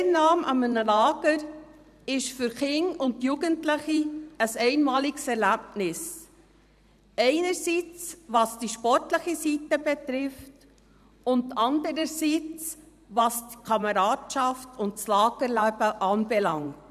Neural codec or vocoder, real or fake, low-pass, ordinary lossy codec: vocoder, 44.1 kHz, 128 mel bands every 512 samples, BigVGAN v2; fake; 14.4 kHz; none